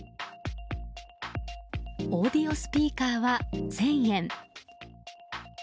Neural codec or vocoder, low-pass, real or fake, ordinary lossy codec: none; none; real; none